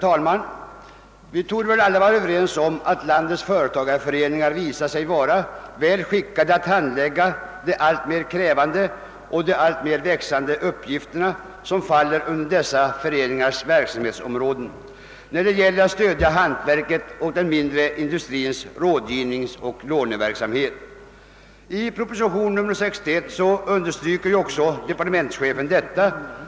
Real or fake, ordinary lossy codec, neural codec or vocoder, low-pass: real; none; none; none